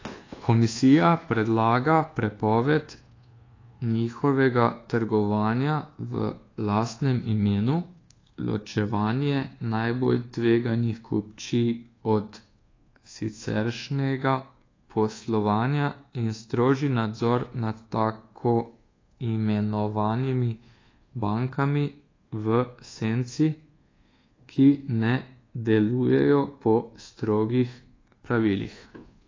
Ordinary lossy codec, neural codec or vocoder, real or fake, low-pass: AAC, 32 kbps; codec, 24 kHz, 1.2 kbps, DualCodec; fake; 7.2 kHz